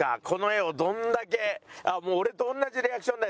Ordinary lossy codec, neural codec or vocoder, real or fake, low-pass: none; none; real; none